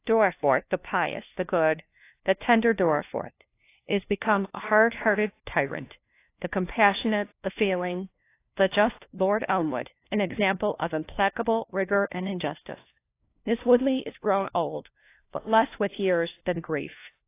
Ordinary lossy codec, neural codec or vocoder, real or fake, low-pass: AAC, 24 kbps; codec, 16 kHz, 1 kbps, FunCodec, trained on LibriTTS, 50 frames a second; fake; 3.6 kHz